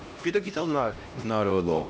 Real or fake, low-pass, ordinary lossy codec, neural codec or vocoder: fake; none; none; codec, 16 kHz, 1 kbps, X-Codec, HuBERT features, trained on LibriSpeech